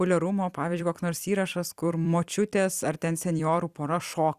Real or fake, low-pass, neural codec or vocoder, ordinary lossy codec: fake; 14.4 kHz; vocoder, 44.1 kHz, 128 mel bands every 256 samples, BigVGAN v2; Opus, 64 kbps